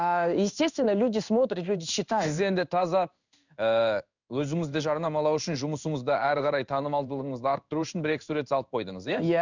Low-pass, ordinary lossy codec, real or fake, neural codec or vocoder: 7.2 kHz; none; fake; codec, 16 kHz in and 24 kHz out, 1 kbps, XY-Tokenizer